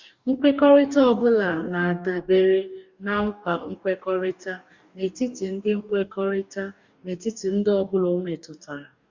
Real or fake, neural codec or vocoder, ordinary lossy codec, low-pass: fake; codec, 44.1 kHz, 2.6 kbps, DAC; Opus, 64 kbps; 7.2 kHz